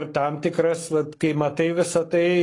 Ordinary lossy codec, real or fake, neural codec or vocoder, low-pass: AAC, 32 kbps; fake; codec, 44.1 kHz, 7.8 kbps, Pupu-Codec; 10.8 kHz